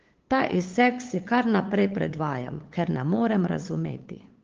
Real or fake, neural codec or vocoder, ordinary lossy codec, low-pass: fake; codec, 16 kHz, 8 kbps, FunCodec, trained on LibriTTS, 25 frames a second; Opus, 16 kbps; 7.2 kHz